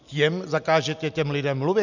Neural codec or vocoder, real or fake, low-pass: none; real; 7.2 kHz